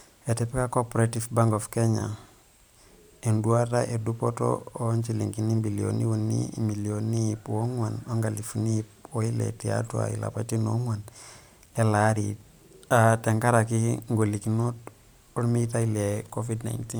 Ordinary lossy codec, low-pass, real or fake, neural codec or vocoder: none; none; fake; vocoder, 44.1 kHz, 128 mel bands every 512 samples, BigVGAN v2